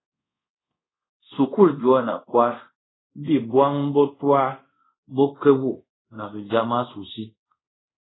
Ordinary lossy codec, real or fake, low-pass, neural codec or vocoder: AAC, 16 kbps; fake; 7.2 kHz; codec, 24 kHz, 0.5 kbps, DualCodec